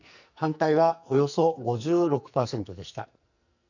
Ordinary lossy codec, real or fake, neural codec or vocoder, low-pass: none; fake; codec, 44.1 kHz, 2.6 kbps, SNAC; 7.2 kHz